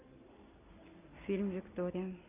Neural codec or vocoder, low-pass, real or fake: none; 3.6 kHz; real